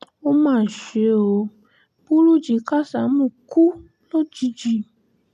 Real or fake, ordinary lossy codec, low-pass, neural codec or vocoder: real; none; 14.4 kHz; none